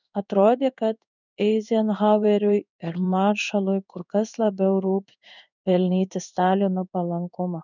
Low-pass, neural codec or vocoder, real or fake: 7.2 kHz; codec, 16 kHz in and 24 kHz out, 1 kbps, XY-Tokenizer; fake